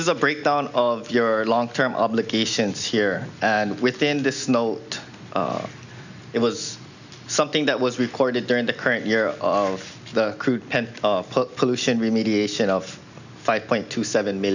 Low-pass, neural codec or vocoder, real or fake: 7.2 kHz; none; real